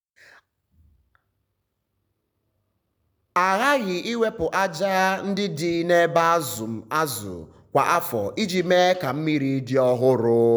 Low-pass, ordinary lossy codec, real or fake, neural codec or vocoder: none; none; real; none